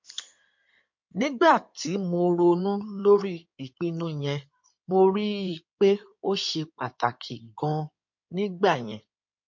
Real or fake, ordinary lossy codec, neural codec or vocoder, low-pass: fake; MP3, 48 kbps; codec, 16 kHz in and 24 kHz out, 2.2 kbps, FireRedTTS-2 codec; 7.2 kHz